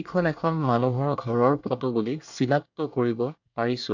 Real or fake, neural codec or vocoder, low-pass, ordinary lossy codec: fake; codec, 24 kHz, 1 kbps, SNAC; 7.2 kHz; none